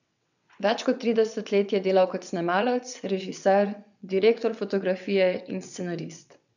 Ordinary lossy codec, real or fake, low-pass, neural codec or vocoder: none; fake; 7.2 kHz; vocoder, 22.05 kHz, 80 mel bands, WaveNeXt